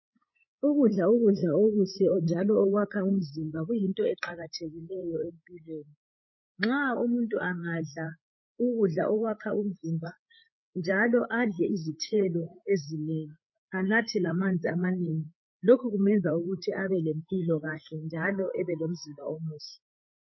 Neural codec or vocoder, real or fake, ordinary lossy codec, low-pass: codec, 16 kHz, 8 kbps, FreqCodec, larger model; fake; MP3, 24 kbps; 7.2 kHz